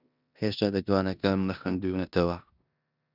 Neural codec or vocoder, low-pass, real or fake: codec, 16 kHz in and 24 kHz out, 0.9 kbps, LongCat-Audio-Codec, four codebook decoder; 5.4 kHz; fake